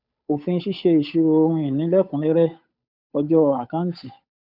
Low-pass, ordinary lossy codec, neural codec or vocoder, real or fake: 5.4 kHz; none; codec, 16 kHz, 8 kbps, FunCodec, trained on Chinese and English, 25 frames a second; fake